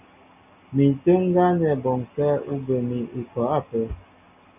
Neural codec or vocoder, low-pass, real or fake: none; 3.6 kHz; real